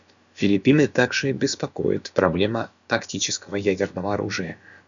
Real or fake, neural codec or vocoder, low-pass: fake; codec, 16 kHz, about 1 kbps, DyCAST, with the encoder's durations; 7.2 kHz